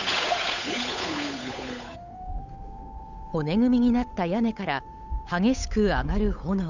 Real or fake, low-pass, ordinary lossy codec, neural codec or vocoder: fake; 7.2 kHz; none; codec, 16 kHz, 8 kbps, FunCodec, trained on Chinese and English, 25 frames a second